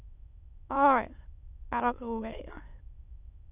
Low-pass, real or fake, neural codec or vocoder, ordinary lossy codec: 3.6 kHz; fake; autoencoder, 22.05 kHz, a latent of 192 numbers a frame, VITS, trained on many speakers; none